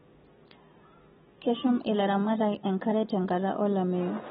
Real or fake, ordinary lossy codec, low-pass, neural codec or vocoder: real; AAC, 16 kbps; 19.8 kHz; none